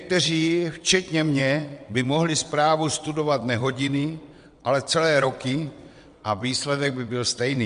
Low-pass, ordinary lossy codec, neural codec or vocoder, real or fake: 9.9 kHz; MP3, 64 kbps; vocoder, 22.05 kHz, 80 mel bands, WaveNeXt; fake